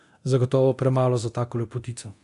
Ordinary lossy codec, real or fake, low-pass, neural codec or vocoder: AAC, 64 kbps; fake; 10.8 kHz; codec, 24 kHz, 0.9 kbps, DualCodec